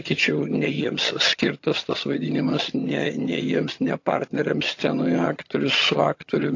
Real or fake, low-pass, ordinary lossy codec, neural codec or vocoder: fake; 7.2 kHz; AAC, 48 kbps; vocoder, 22.05 kHz, 80 mel bands, HiFi-GAN